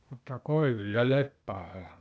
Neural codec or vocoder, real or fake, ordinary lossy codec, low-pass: codec, 16 kHz, 0.8 kbps, ZipCodec; fake; none; none